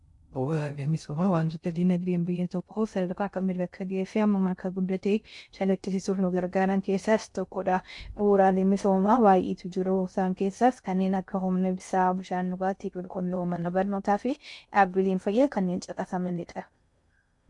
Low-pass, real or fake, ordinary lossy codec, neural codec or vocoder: 10.8 kHz; fake; MP3, 64 kbps; codec, 16 kHz in and 24 kHz out, 0.6 kbps, FocalCodec, streaming, 2048 codes